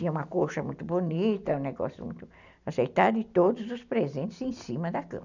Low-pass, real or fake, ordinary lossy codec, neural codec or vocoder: 7.2 kHz; real; none; none